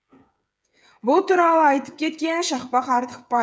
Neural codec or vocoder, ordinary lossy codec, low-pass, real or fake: codec, 16 kHz, 8 kbps, FreqCodec, smaller model; none; none; fake